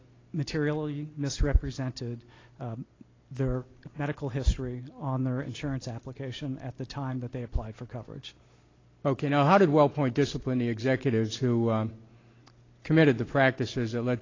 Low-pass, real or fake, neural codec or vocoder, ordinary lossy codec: 7.2 kHz; real; none; AAC, 32 kbps